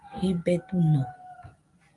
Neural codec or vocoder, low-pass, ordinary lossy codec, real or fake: none; 10.8 kHz; Opus, 32 kbps; real